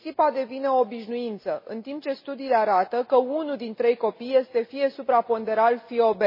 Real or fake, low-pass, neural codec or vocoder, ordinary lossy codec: real; 5.4 kHz; none; MP3, 24 kbps